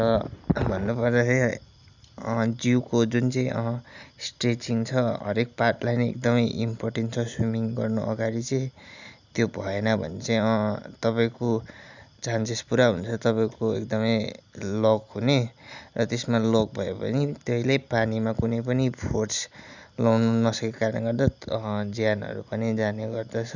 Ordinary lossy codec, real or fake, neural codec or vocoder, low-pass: none; real; none; 7.2 kHz